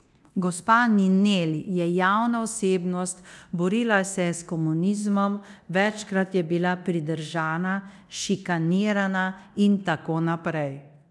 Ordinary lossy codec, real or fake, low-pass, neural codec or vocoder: none; fake; none; codec, 24 kHz, 0.9 kbps, DualCodec